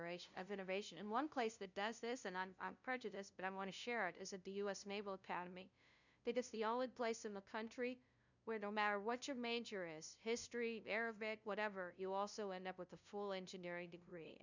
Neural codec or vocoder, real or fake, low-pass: codec, 16 kHz, 0.5 kbps, FunCodec, trained on LibriTTS, 25 frames a second; fake; 7.2 kHz